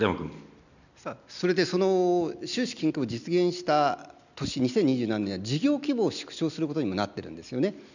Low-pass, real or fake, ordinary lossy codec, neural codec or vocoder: 7.2 kHz; real; none; none